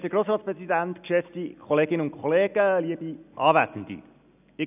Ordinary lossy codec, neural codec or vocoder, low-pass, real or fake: none; none; 3.6 kHz; real